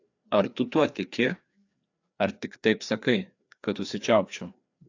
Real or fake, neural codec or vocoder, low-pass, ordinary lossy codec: fake; codec, 16 kHz, 2 kbps, FreqCodec, larger model; 7.2 kHz; AAC, 48 kbps